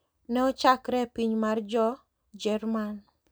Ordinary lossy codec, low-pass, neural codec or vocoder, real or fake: none; none; none; real